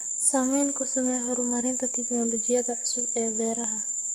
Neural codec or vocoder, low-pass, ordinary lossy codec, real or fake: codec, 44.1 kHz, 7.8 kbps, DAC; 19.8 kHz; Opus, 64 kbps; fake